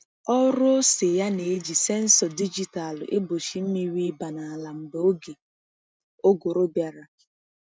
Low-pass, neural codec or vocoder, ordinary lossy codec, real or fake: none; none; none; real